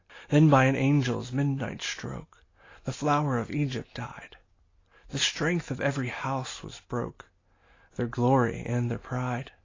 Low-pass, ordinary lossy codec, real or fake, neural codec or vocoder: 7.2 kHz; AAC, 32 kbps; real; none